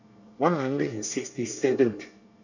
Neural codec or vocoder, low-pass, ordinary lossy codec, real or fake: codec, 24 kHz, 1 kbps, SNAC; 7.2 kHz; none; fake